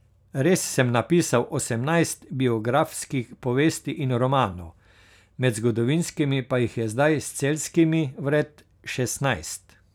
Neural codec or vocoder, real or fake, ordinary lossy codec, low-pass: none; real; none; 19.8 kHz